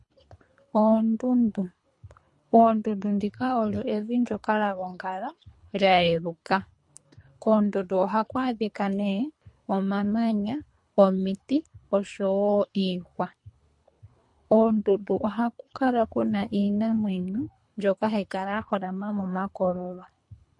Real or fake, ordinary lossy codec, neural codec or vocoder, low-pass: fake; MP3, 48 kbps; codec, 24 kHz, 3 kbps, HILCodec; 10.8 kHz